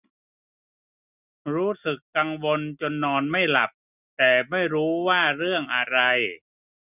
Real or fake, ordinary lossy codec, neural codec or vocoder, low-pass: real; none; none; 3.6 kHz